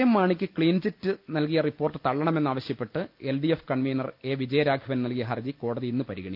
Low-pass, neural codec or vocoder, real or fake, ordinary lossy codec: 5.4 kHz; none; real; Opus, 24 kbps